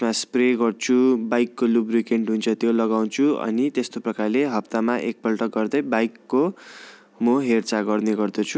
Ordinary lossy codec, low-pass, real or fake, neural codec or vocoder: none; none; real; none